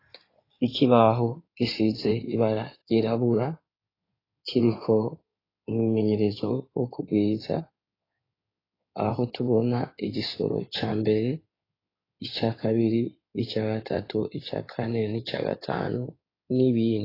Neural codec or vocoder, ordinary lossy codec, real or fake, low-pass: codec, 16 kHz in and 24 kHz out, 2.2 kbps, FireRedTTS-2 codec; AAC, 24 kbps; fake; 5.4 kHz